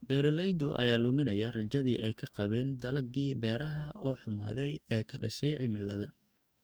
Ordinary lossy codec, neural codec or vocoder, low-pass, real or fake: none; codec, 44.1 kHz, 2.6 kbps, DAC; none; fake